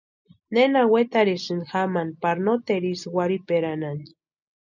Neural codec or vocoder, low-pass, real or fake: none; 7.2 kHz; real